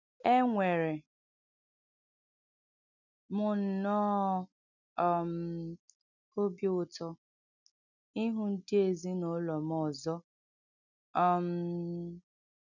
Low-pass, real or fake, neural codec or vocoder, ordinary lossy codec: 7.2 kHz; real; none; none